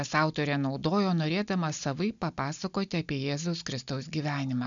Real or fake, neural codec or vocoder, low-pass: real; none; 7.2 kHz